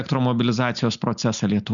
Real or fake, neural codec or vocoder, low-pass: real; none; 7.2 kHz